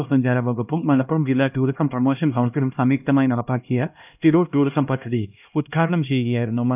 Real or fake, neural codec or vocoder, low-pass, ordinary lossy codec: fake; codec, 16 kHz, 1 kbps, X-Codec, HuBERT features, trained on LibriSpeech; 3.6 kHz; none